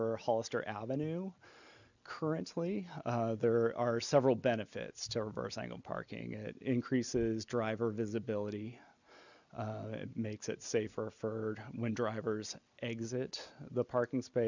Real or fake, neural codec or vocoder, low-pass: real; none; 7.2 kHz